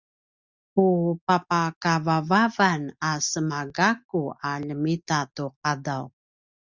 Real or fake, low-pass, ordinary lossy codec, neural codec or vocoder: real; 7.2 kHz; Opus, 64 kbps; none